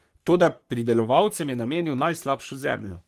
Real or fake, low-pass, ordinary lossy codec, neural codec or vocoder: fake; 14.4 kHz; Opus, 24 kbps; codec, 44.1 kHz, 3.4 kbps, Pupu-Codec